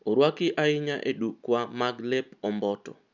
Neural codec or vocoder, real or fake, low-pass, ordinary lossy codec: none; real; 7.2 kHz; none